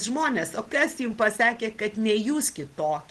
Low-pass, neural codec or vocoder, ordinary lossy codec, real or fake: 10.8 kHz; none; Opus, 16 kbps; real